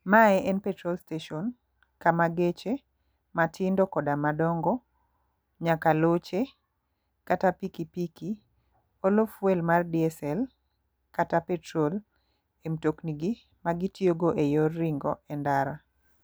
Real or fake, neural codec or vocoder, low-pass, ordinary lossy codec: real; none; none; none